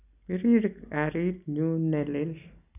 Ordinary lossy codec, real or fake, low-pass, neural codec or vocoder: none; real; 3.6 kHz; none